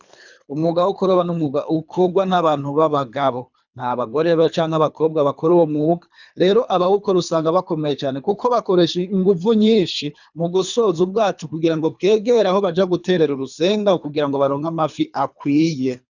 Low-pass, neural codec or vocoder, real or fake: 7.2 kHz; codec, 24 kHz, 3 kbps, HILCodec; fake